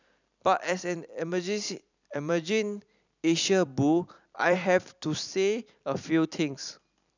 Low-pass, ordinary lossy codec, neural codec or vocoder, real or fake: 7.2 kHz; none; vocoder, 44.1 kHz, 128 mel bands every 256 samples, BigVGAN v2; fake